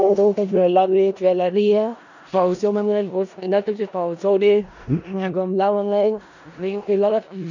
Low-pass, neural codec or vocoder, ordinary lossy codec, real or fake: 7.2 kHz; codec, 16 kHz in and 24 kHz out, 0.4 kbps, LongCat-Audio-Codec, four codebook decoder; none; fake